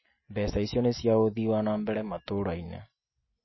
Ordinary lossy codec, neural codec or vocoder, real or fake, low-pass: MP3, 24 kbps; none; real; 7.2 kHz